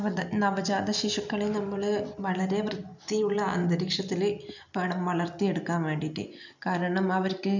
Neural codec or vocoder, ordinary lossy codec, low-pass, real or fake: none; none; 7.2 kHz; real